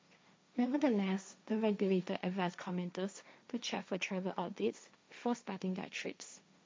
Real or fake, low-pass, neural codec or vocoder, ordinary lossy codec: fake; none; codec, 16 kHz, 1.1 kbps, Voila-Tokenizer; none